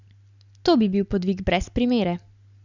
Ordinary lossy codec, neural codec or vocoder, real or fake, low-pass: none; none; real; 7.2 kHz